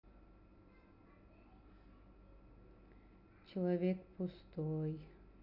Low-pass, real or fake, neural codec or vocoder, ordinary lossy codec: 5.4 kHz; real; none; none